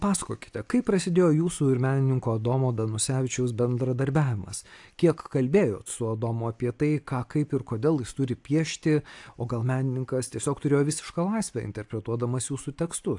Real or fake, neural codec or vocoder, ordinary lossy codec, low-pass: real; none; AAC, 64 kbps; 10.8 kHz